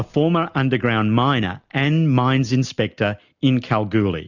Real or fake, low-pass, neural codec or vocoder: real; 7.2 kHz; none